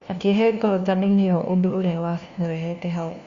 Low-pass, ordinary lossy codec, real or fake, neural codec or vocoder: 7.2 kHz; Opus, 64 kbps; fake; codec, 16 kHz, 1 kbps, FunCodec, trained on LibriTTS, 50 frames a second